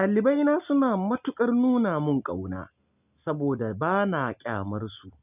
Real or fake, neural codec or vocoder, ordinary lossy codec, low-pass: real; none; none; 3.6 kHz